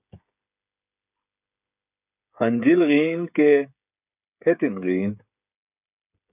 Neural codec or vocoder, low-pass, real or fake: codec, 16 kHz, 8 kbps, FreqCodec, smaller model; 3.6 kHz; fake